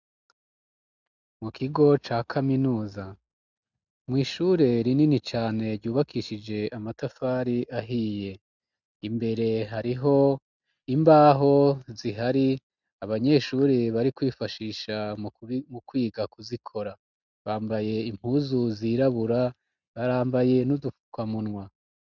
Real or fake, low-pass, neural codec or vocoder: real; 7.2 kHz; none